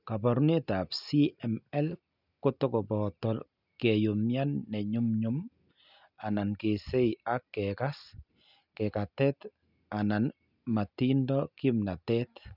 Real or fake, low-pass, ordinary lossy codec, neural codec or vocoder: real; 5.4 kHz; none; none